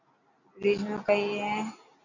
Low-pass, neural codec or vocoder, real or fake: 7.2 kHz; none; real